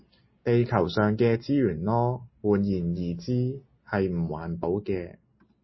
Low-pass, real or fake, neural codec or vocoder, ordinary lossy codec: 7.2 kHz; real; none; MP3, 24 kbps